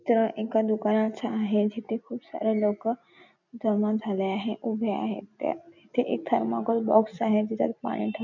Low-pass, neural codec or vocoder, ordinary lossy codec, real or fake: 7.2 kHz; none; none; real